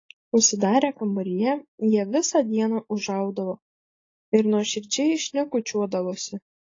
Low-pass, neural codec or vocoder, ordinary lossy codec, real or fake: 7.2 kHz; none; AAC, 32 kbps; real